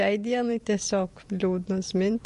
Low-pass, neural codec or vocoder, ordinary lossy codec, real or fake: 14.4 kHz; vocoder, 44.1 kHz, 128 mel bands every 512 samples, BigVGAN v2; MP3, 48 kbps; fake